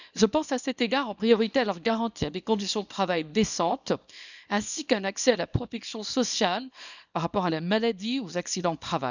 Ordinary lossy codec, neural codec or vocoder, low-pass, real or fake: none; codec, 24 kHz, 0.9 kbps, WavTokenizer, small release; 7.2 kHz; fake